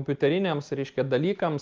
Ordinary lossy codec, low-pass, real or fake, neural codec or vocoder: Opus, 24 kbps; 7.2 kHz; real; none